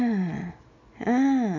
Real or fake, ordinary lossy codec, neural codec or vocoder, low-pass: real; none; none; 7.2 kHz